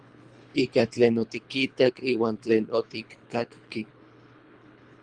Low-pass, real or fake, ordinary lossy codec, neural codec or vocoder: 9.9 kHz; fake; Opus, 32 kbps; codec, 24 kHz, 3 kbps, HILCodec